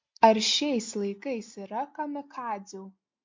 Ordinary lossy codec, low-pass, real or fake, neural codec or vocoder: MP3, 48 kbps; 7.2 kHz; real; none